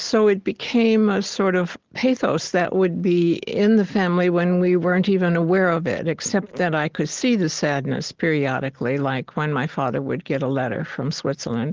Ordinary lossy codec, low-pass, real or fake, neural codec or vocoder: Opus, 16 kbps; 7.2 kHz; real; none